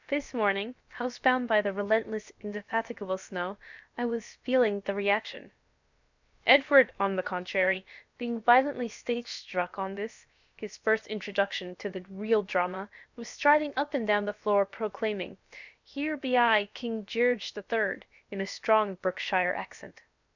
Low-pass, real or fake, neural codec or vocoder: 7.2 kHz; fake; codec, 16 kHz, about 1 kbps, DyCAST, with the encoder's durations